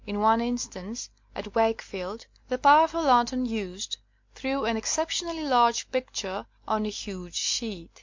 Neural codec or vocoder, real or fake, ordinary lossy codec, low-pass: none; real; MP3, 48 kbps; 7.2 kHz